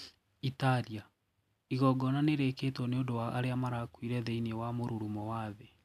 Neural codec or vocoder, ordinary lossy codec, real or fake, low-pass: none; MP3, 96 kbps; real; 14.4 kHz